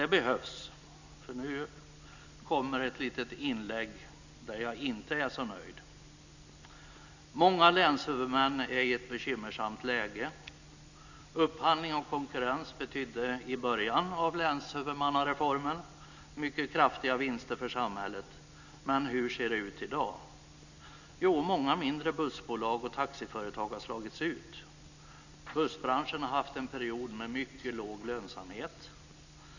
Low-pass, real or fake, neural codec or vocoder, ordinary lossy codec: 7.2 kHz; real; none; none